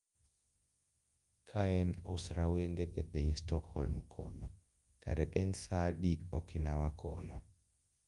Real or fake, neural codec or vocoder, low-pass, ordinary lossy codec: fake; codec, 24 kHz, 0.9 kbps, WavTokenizer, large speech release; 10.8 kHz; Opus, 32 kbps